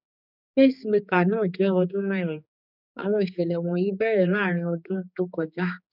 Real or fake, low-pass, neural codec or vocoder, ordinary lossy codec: fake; 5.4 kHz; codec, 16 kHz, 4 kbps, X-Codec, HuBERT features, trained on general audio; none